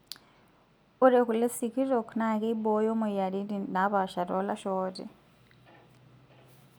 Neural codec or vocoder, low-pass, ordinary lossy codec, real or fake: none; none; none; real